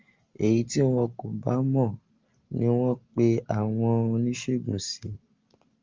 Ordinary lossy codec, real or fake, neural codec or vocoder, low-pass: Opus, 32 kbps; real; none; 7.2 kHz